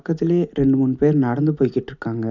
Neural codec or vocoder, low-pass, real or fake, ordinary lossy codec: none; 7.2 kHz; real; none